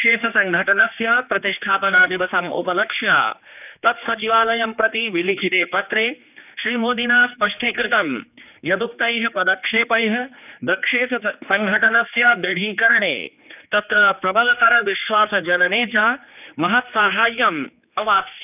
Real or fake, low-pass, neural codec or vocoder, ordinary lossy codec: fake; 3.6 kHz; codec, 16 kHz, 2 kbps, X-Codec, HuBERT features, trained on general audio; none